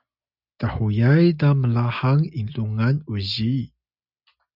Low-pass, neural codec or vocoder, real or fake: 5.4 kHz; none; real